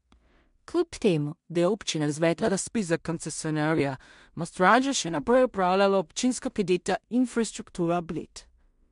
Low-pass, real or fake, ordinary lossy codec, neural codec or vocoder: 10.8 kHz; fake; MP3, 64 kbps; codec, 16 kHz in and 24 kHz out, 0.4 kbps, LongCat-Audio-Codec, two codebook decoder